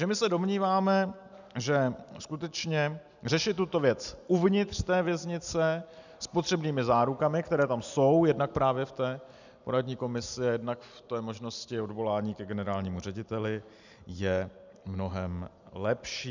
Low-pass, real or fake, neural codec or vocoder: 7.2 kHz; real; none